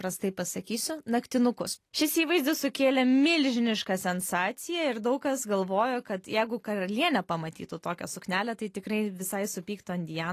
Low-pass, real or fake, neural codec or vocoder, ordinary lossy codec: 14.4 kHz; real; none; AAC, 48 kbps